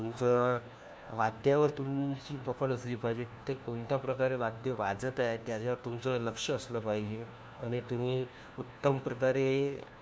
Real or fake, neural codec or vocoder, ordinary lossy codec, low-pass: fake; codec, 16 kHz, 1 kbps, FunCodec, trained on LibriTTS, 50 frames a second; none; none